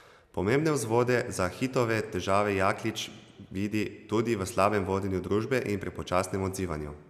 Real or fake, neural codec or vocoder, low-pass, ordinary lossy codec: real; none; 14.4 kHz; none